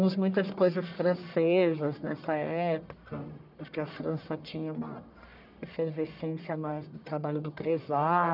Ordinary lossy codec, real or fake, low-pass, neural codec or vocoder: none; fake; 5.4 kHz; codec, 44.1 kHz, 1.7 kbps, Pupu-Codec